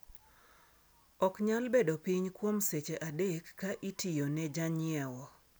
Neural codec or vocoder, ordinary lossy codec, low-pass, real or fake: none; none; none; real